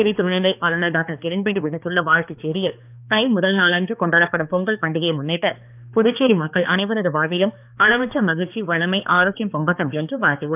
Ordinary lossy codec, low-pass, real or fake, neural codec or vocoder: none; 3.6 kHz; fake; codec, 16 kHz, 2 kbps, X-Codec, HuBERT features, trained on balanced general audio